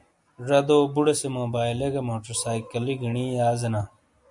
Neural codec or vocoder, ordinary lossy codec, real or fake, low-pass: none; MP3, 96 kbps; real; 10.8 kHz